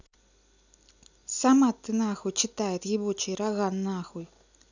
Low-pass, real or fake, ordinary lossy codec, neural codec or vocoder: 7.2 kHz; real; none; none